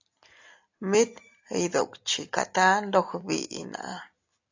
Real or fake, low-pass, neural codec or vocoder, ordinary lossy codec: real; 7.2 kHz; none; AAC, 48 kbps